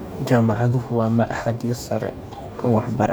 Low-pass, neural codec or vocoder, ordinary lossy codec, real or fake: none; codec, 44.1 kHz, 2.6 kbps, DAC; none; fake